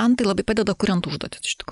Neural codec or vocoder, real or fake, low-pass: none; real; 10.8 kHz